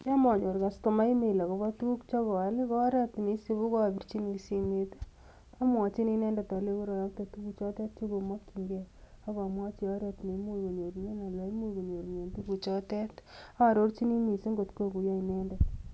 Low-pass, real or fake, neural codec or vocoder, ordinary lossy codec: none; real; none; none